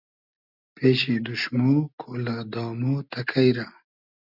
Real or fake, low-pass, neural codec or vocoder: real; 5.4 kHz; none